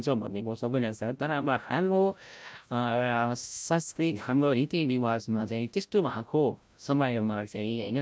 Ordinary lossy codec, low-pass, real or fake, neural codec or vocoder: none; none; fake; codec, 16 kHz, 0.5 kbps, FreqCodec, larger model